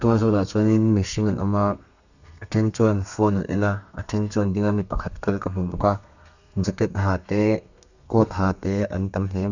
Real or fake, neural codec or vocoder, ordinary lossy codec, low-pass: fake; codec, 32 kHz, 1.9 kbps, SNAC; none; 7.2 kHz